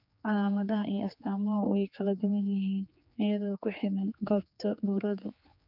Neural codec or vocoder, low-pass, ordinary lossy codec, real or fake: codec, 16 kHz, 4 kbps, X-Codec, HuBERT features, trained on general audio; 5.4 kHz; none; fake